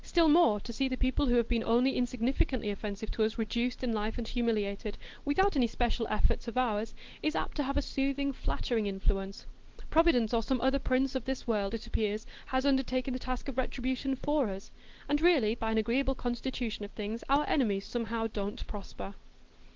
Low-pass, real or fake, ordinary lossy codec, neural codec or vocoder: 7.2 kHz; real; Opus, 16 kbps; none